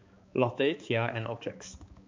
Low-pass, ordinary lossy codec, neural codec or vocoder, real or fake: 7.2 kHz; MP3, 48 kbps; codec, 16 kHz, 4 kbps, X-Codec, HuBERT features, trained on balanced general audio; fake